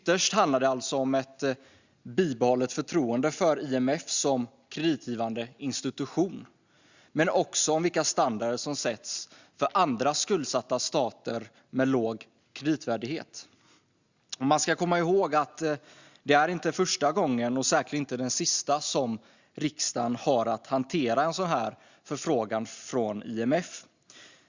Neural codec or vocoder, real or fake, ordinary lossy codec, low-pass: none; real; Opus, 64 kbps; 7.2 kHz